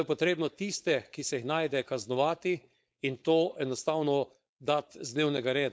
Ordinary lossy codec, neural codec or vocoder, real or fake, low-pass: none; codec, 16 kHz, 4.8 kbps, FACodec; fake; none